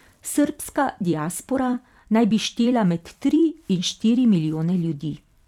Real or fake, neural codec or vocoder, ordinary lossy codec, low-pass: fake; vocoder, 44.1 kHz, 128 mel bands every 256 samples, BigVGAN v2; none; 19.8 kHz